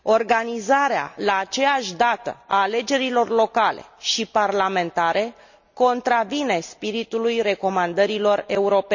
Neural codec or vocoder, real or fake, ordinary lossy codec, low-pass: none; real; none; 7.2 kHz